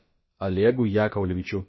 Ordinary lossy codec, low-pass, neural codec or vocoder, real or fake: MP3, 24 kbps; 7.2 kHz; codec, 16 kHz, about 1 kbps, DyCAST, with the encoder's durations; fake